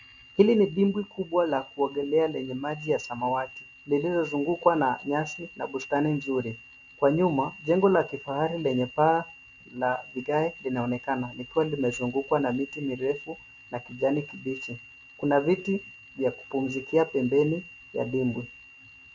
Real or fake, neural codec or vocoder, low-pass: real; none; 7.2 kHz